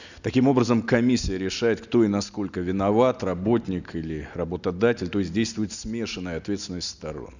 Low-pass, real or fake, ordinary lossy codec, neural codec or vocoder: 7.2 kHz; real; none; none